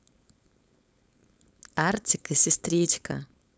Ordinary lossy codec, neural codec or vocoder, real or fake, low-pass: none; codec, 16 kHz, 4.8 kbps, FACodec; fake; none